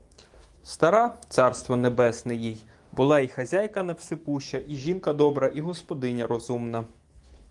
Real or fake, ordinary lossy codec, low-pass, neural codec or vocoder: fake; Opus, 24 kbps; 10.8 kHz; autoencoder, 48 kHz, 128 numbers a frame, DAC-VAE, trained on Japanese speech